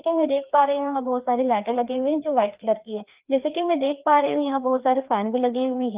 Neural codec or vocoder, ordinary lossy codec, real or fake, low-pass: codec, 16 kHz, 2 kbps, FreqCodec, larger model; Opus, 32 kbps; fake; 3.6 kHz